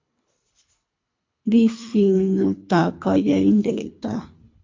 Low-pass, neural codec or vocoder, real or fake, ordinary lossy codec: 7.2 kHz; codec, 24 kHz, 3 kbps, HILCodec; fake; MP3, 48 kbps